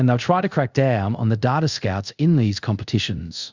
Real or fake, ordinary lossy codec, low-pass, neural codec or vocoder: fake; Opus, 64 kbps; 7.2 kHz; codec, 24 kHz, 0.5 kbps, DualCodec